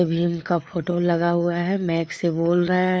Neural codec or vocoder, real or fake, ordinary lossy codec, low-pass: codec, 16 kHz, 4 kbps, FreqCodec, larger model; fake; none; none